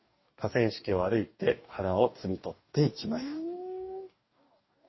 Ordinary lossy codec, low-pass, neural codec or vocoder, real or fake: MP3, 24 kbps; 7.2 kHz; codec, 44.1 kHz, 2.6 kbps, DAC; fake